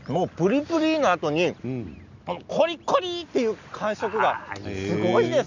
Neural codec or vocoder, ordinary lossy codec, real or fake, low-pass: none; none; real; 7.2 kHz